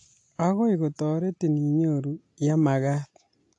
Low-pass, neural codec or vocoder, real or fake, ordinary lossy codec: 10.8 kHz; none; real; AAC, 48 kbps